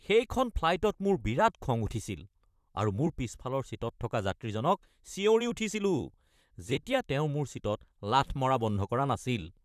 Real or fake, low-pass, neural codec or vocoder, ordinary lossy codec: fake; 14.4 kHz; vocoder, 44.1 kHz, 128 mel bands, Pupu-Vocoder; none